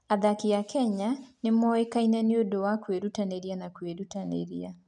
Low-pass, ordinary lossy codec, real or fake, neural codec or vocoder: 10.8 kHz; none; real; none